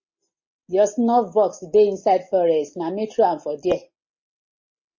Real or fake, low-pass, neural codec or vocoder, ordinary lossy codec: real; 7.2 kHz; none; MP3, 32 kbps